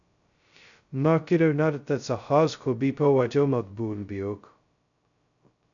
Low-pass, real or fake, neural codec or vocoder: 7.2 kHz; fake; codec, 16 kHz, 0.2 kbps, FocalCodec